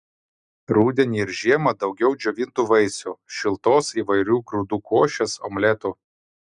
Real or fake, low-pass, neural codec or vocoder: real; 10.8 kHz; none